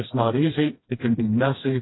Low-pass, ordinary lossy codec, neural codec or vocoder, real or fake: 7.2 kHz; AAC, 16 kbps; codec, 16 kHz, 1 kbps, FreqCodec, smaller model; fake